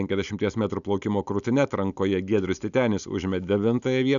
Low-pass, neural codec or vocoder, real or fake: 7.2 kHz; none; real